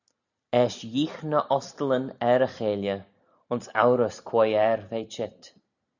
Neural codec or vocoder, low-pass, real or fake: none; 7.2 kHz; real